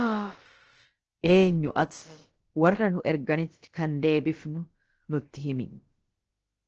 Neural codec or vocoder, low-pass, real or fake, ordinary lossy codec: codec, 16 kHz, about 1 kbps, DyCAST, with the encoder's durations; 7.2 kHz; fake; Opus, 16 kbps